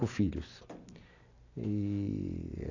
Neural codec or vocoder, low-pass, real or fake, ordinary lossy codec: none; 7.2 kHz; real; none